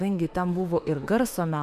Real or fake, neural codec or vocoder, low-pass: fake; autoencoder, 48 kHz, 32 numbers a frame, DAC-VAE, trained on Japanese speech; 14.4 kHz